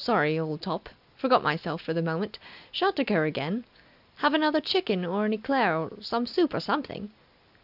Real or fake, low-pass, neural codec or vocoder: real; 5.4 kHz; none